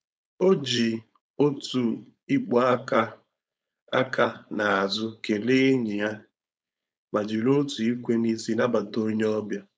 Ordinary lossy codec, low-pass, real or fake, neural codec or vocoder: none; none; fake; codec, 16 kHz, 4.8 kbps, FACodec